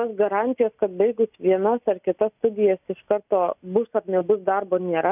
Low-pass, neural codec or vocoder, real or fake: 3.6 kHz; none; real